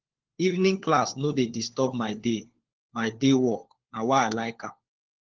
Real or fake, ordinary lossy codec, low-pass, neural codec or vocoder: fake; Opus, 16 kbps; 7.2 kHz; codec, 16 kHz, 4 kbps, FunCodec, trained on LibriTTS, 50 frames a second